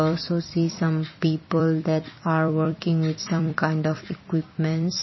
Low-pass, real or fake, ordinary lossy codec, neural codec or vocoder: 7.2 kHz; fake; MP3, 24 kbps; vocoder, 44.1 kHz, 128 mel bands every 256 samples, BigVGAN v2